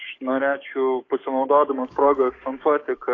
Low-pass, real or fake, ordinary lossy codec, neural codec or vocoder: 7.2 kHz; fake; AAC, 32 kbps; codec, 44.1 kHz, 7.8 kbps, DAC